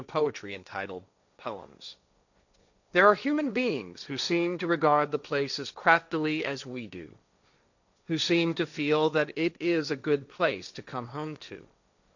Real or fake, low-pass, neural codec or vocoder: fake; 7.2 kHz; codec, 16 kHz, 1.1 kbps, Voila-Tokenizer